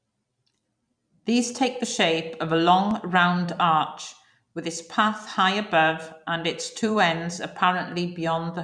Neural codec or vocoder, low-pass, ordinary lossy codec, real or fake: vocoder, 44.1 kHz, 128 mel bands every 256 samples, BigVGAN v2; 9.9 kHz; none; fake